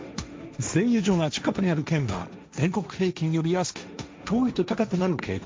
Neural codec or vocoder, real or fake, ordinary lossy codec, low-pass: codec, 16 kHz, 1.1 kbps, Voila-Tokenizer; fake; none; none